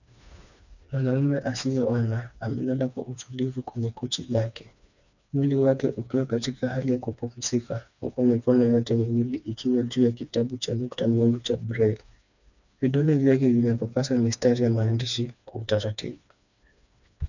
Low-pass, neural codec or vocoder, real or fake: 7.2 kHz; codec, 16 kHz, 2 kbps, FreqCodec, smaller model; fake